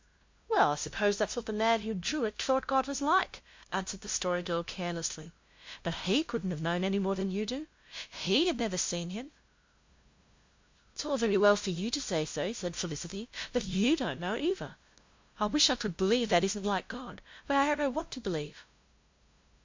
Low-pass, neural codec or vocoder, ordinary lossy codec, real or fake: 7.2 kHz; codec, 16 kHz, 0.5 kbps, FunCodec, trained on LibriTTS, 25 frames a second; MP3, 48 kbps; fake